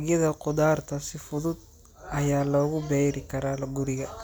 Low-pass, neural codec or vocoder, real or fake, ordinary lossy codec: none; none; real; none